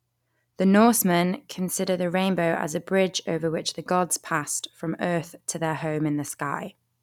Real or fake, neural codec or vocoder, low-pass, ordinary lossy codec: real; none; 19.8 kHz; none